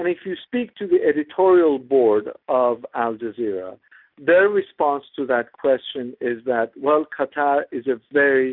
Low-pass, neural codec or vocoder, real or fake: 5.4 kHz; none; real